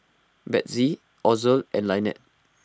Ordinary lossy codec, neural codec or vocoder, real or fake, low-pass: none; none; real; none